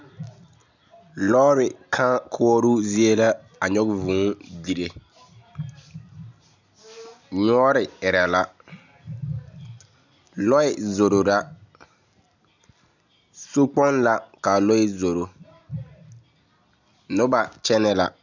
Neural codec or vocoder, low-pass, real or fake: none; 7.2 kHz; real